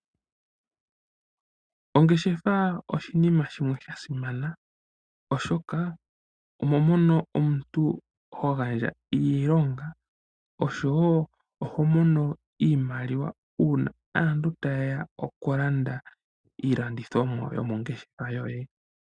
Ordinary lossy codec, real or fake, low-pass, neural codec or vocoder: Opus, 64 kbps; real; 9.9 kHz; none